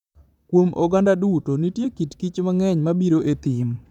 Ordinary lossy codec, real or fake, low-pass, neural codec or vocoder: none; fake; 19.8 kHz; vocoder, 44.1 kHz, 128 mel bands, Pupu-Vocoder